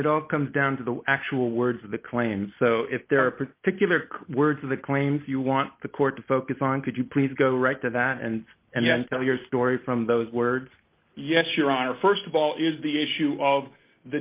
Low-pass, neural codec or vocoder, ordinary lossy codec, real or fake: 3.6 kHz; none; Opus, 24 kbps; real